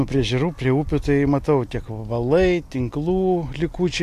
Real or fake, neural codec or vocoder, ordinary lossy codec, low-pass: real; none; AAC, 64 kbps; 14.4 kHz